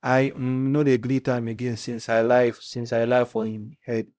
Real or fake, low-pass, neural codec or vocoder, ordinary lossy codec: fake; none; codec, 16 kHz, 0.5 kbps, X-Codec, HuBERT features, trained on LibriSpeech; none